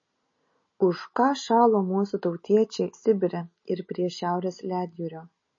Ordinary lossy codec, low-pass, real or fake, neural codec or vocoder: MP3, 32 kbps; 7.2 kHz; real; none